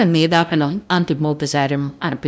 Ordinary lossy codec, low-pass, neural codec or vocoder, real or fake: none; none; codec, 16 kHz, 0.5 kbps, FunCodec, trained on LibriTTS, 25 frames a second; fake